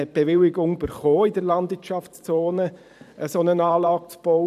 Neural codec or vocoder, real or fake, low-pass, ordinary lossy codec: vocoder, 44.1 kHz, 128 mel bands every 512 samples, BigVGAN v2; fake; 14.4 kHz; none